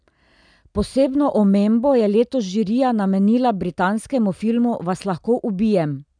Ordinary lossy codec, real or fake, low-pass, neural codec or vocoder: none; real; 9.9 kHz; none